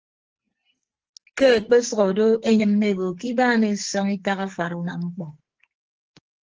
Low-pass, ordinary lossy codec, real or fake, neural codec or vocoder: 7.2 kHz; Opus, 16 kbps; fake; codec, 44.1 kHz, 2.6 kbps, SNAC